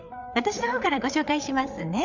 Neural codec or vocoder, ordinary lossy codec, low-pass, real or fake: codec, 16 kHz, 8 kbps, FreqCodec, larger model; none; 7.2 kHz; fake